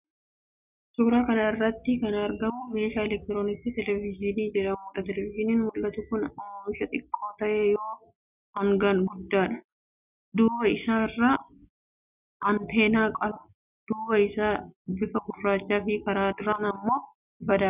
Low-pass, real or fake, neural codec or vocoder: 3.6 kHz; real; none